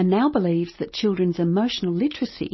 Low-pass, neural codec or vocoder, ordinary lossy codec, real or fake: 7.2 kHz; none; MP3, 24 kbps; real